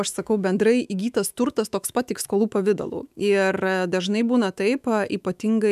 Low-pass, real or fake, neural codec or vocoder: 14.4 kHz; fake; autoencoder, 48 kHz, 128 numbers a frame, DAC-VAE, trained on Japanese speech